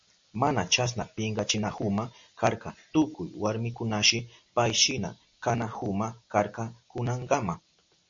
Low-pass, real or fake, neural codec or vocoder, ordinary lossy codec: 7.2 kHz; real; none; MP3, 48 kbps